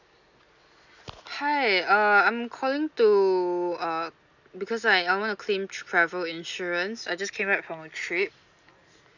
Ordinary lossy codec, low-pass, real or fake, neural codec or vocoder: none; 7.2 kHz; real; none